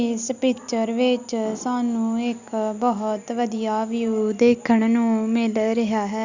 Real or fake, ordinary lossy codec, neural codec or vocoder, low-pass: real; Opus, 64 kbps; none; 7.2 kHz